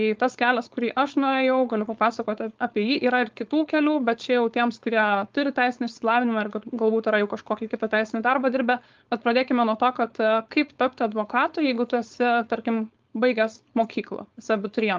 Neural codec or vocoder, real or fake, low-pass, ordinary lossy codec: codec, 16 kHz, 4.8 kbps, FACodec; fake; 7.2 kHz; Opus, 32 kbps